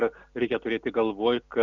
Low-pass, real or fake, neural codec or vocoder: 7.2 kHz; real; none